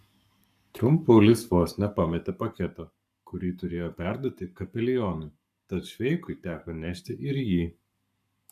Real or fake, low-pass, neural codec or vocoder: fake; 14.4 kHz; codec, 44.1 kHz, 7.8 kbps, Pupu-Codec